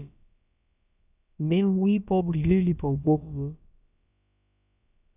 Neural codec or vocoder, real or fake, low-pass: codec, 16 kHz, about 1 kbps, DyCAST, with the encoder's durations; fake; 3.6 kHz